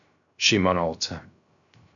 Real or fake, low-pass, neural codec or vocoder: fake; 7.2 kHz; codec, 16 kHz, 0.3 kbps, FocalCodec